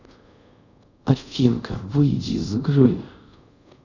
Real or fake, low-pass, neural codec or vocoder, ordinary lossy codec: fake; 7.2 kHz; codec, 24 kHz, 0.5 kbps, DualCodec; none